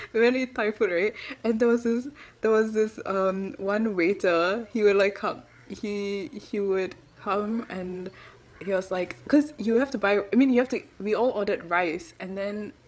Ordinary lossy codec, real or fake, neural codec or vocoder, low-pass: none; fake; codec, 16 kHz, 8 kbps, FreqCodec, larger model; none